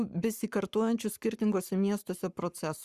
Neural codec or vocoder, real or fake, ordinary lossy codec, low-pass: codec, 44.1 kHz, 7.8 kbps, Pupu-Codec; fake; Opus, 64 kbps; 14.4 kHz